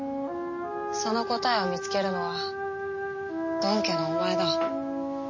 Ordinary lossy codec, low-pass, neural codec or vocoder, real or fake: MP3, 32 kbps; 7.2 kHz; none; real